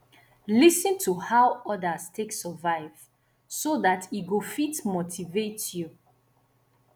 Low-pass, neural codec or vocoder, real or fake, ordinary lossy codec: none; none; real; none